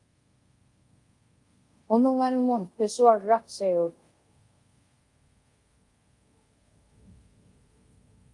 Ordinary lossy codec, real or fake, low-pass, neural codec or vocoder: Opus, 32 kbps; fake; 10.8 kHz; codec, 24 kHz, 0.5 kbps, DualCodec